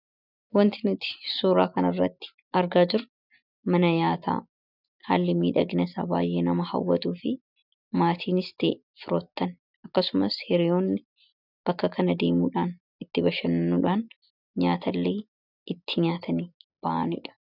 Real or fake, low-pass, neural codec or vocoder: real; 5.4 kHz; none